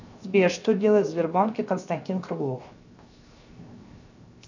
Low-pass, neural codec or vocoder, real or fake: 7.2 kHz; codec, 16 kHz, 0.7 kbps, FocalCodec; fake